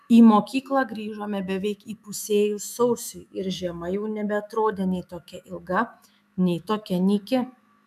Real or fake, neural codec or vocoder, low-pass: fake; autoencoder, 48 kHz, 128 numbers a frame, DAC-VAE, trained on Japanese speech; 14.4 kHz